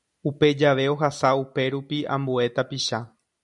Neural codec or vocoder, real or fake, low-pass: none; real; 10.8 kHz